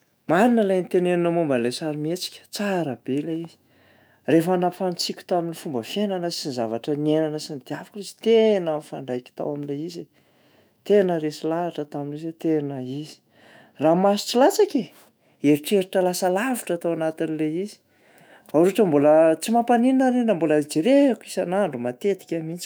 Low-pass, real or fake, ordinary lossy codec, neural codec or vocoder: none; fake; none; autoencoder, 48 kHz, 128 numbers a frame, DAC-VAE, trained on Japanese speech